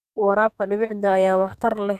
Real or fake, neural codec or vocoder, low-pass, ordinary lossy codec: fake; codec, 44.1 kHz, 2.6 kbps, SNAC; 14.4 kHz; none